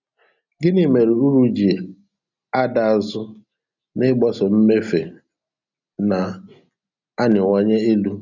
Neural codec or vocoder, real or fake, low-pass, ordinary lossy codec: none; real; 7.2 kHz; none